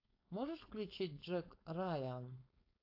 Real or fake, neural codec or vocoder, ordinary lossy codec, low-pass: fake; codec, 16 kHz, 4.8 kbps, FACodec; AAC, 48 kbps; 5.4 kHz